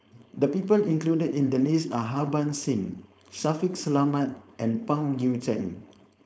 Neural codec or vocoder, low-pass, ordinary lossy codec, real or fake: codec, 16 kHz, 4.8 kbps, FACodec; none; none; fake